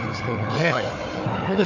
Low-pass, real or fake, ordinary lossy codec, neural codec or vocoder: 7.2 kHz; fake; AAC, 48 kbps; codec, 16 kHz, 4 kbps, FunCodec, trained on Chinese and English, 50 frames a second